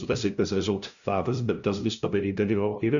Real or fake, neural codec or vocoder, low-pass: fake; codec, 16 kHz, 0.5 kbps, FunCodec, trained on LibriTTS, 25 frames a second; 7.2 kHz